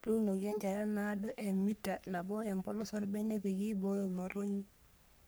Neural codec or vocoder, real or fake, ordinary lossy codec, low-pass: codec, 44.1 kHz, 3.4 kbps, Pupu-Codec; fake; none; none